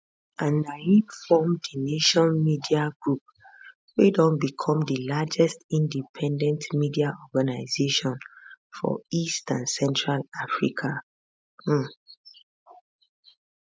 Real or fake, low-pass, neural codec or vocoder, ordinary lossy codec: real; none; none; none